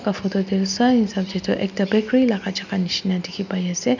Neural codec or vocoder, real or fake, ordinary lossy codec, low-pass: none; real; none; 7.2 kHz